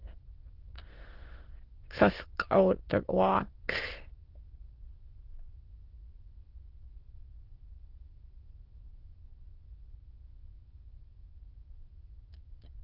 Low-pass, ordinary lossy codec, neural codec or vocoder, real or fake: 5.4 kHz; Opus, 16 kbps; autoencoder, 22.05 kHz, a latent of 192 numbers a frame, VITS, trained on many speakers; fake